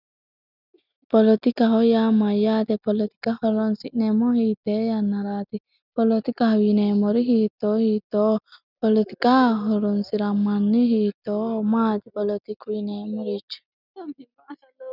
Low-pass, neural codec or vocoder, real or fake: 5.4 kHz; none; real